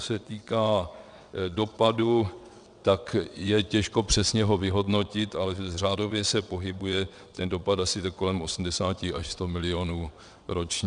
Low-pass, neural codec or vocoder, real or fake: 9.9 kHz; vocoder, 22.05 kHz, 80 mel bands, WaveNeXt; fake